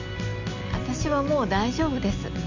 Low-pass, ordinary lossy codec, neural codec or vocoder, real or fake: 7.2 kHz; none; none; real